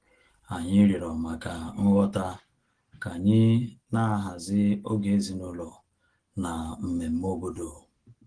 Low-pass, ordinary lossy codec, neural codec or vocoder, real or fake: 9.9 kHz; Opus, 16 kbps; none; real